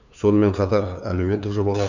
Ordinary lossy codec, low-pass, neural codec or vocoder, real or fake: none; 7.2 kHz; codec, 16 kHz, 2 kbps, FunCodec, trained on LibriTTS, 25 frames a second; fake